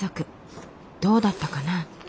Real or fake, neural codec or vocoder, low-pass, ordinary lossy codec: real; none; none; none